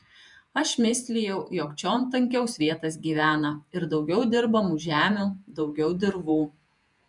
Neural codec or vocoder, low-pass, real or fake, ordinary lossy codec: vocoder, 48 kHz, 128 mel bands, Vocos; 10.8 kHz; fake; MP3, 96 kbps